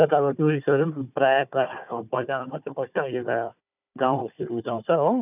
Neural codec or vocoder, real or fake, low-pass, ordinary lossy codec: codec, 16 kHz, 4 kbps, FunCodec, trained on Chinese and English, 50 frames a second; fake; 3.6 kHz; none